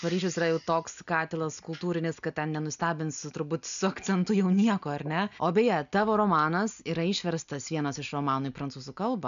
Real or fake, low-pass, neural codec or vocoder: real; 7.2 kHz; none